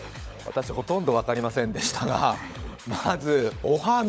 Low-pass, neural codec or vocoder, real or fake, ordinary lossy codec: none; codec, 16 kHz, 16 kbps, FunCodec, trained on LibriTTS, 50 frames a second; fake; none